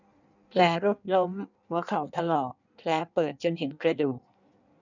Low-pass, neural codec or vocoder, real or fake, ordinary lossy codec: 7.2 kHz; codec, 16 kHz in and 24 kHz out, 1.1 kbps, FireRedTTS-2 codec; fake; none